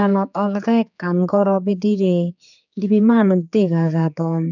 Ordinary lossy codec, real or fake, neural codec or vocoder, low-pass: none; fake; codec, 16 kHz, 4 kbps, X-Codec, HuBERT features, trained on general audio; 7.2 kHz